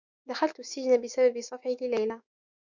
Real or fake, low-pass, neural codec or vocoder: fake; 7.2 kHz; autoencoder, 48 kHz, 128 numbers a frame, DAC-VAE, trained on Japanese speech